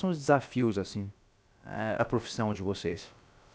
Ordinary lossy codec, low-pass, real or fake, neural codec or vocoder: none; none; fake; codec, 16 kHz, about 1 kbps, DyCAST, with the encoder's durations